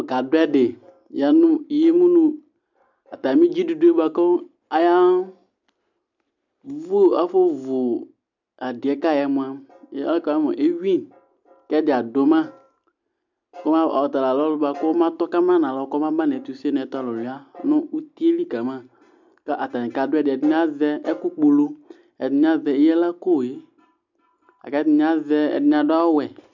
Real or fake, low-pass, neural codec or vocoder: real; 7.2 kHz; none